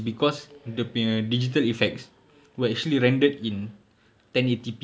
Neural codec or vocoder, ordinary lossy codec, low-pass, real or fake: none; none; none; real